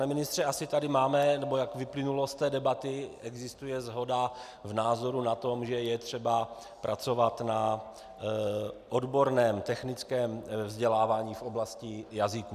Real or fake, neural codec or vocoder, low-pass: real; none; 14.4 kHz